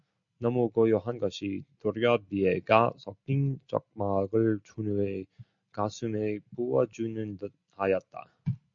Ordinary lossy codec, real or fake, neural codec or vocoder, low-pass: MP3, 48 kbps; real; none; 7.2 kHz